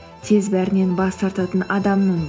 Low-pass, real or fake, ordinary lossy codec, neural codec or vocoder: none; real; none; none